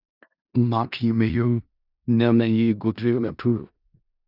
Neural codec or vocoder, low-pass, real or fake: codec, 16 kHz in and 24 kHz out, 0.4 kbps, LongCat-Audio-Codec, four codebook decoder; 5.4 kHz; fake